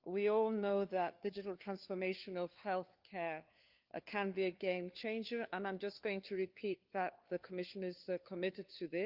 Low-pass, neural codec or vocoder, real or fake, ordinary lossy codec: 5.4 kHz; codec, 16 kHz, 4 kbps, FunCodec, trained on LibriTTS, 50 frames a second; fake; Opus, 24 kbps